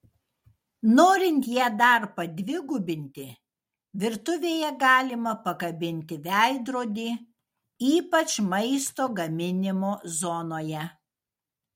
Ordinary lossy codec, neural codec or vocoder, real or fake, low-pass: MP3, 64 kbps; none; real; 19.8 kHz